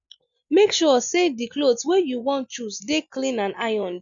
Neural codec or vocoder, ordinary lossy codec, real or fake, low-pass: none; none; real; 7.2 kHz